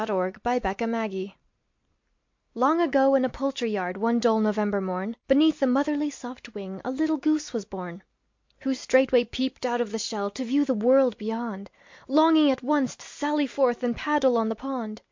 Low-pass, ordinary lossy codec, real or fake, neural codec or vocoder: 7.2 kHz; MP3, 48 kbps; real; none